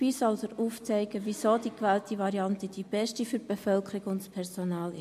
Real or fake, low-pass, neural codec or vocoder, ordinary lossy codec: real; 14.4 kHz; none; MP3, 64 kbps